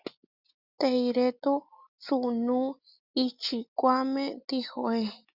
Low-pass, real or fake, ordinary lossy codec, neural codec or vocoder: 5.4 kHz; real; Opus, 64 kbps; none